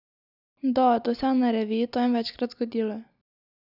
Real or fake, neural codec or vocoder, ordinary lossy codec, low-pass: real; none; none; 5.4 kHz